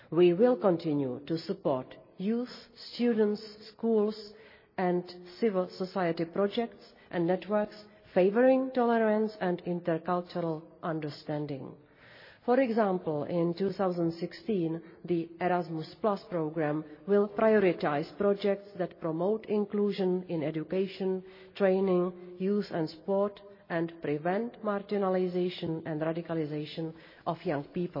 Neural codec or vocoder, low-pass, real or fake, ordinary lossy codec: none; 5.4 kHz; real; MP3, 24 kbps